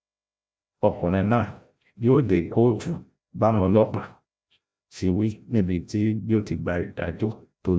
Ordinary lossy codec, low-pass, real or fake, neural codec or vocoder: none; none; fake; codec, 16 kHz, 0.5 kbps, FreqCodec, larger model